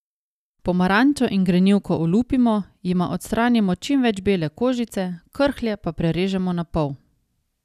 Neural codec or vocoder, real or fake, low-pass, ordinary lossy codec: none; real; 14.4 kHz; none